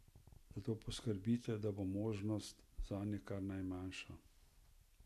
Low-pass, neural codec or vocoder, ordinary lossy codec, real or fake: 14.4 kHz; none; none; real